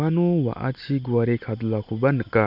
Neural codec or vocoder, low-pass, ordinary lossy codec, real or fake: none; 5.4 kHz; MP3, 48 kbps; real